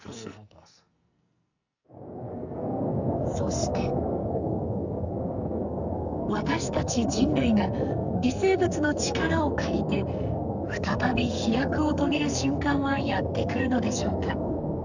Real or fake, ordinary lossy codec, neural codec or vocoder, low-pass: fake; none; codec, 44.1 kHz, 2.6 kbps, SNAC; 7.2 kHz